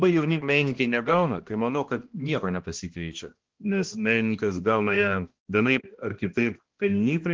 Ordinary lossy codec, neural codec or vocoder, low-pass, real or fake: Opus, 16 kbps; codec, 16 kHz, 1 kbps, X-Codec, HuBERT features, trained on balanced general audio; 7.2 kHz; fake